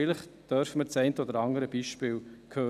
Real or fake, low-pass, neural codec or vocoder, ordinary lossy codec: real; 14.4 kHz; none; none